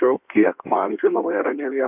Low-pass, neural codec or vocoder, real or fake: 3.6 kHz; codec, 16 kHz in and 24 kHz out, 1.1 kbps, FireRedTTS-2 codec; fake